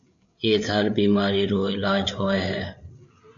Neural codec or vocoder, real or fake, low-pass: codec, 16 kHz, 8 kbps, FreqCodec, larger model; fake; 7.2 kHz